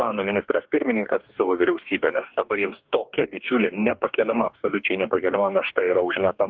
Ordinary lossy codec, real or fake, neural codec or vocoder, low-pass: Opus, 32 kbps; fake; codec, 44.1 kHz, 2.6 kbps, DAC; 7.2 kHz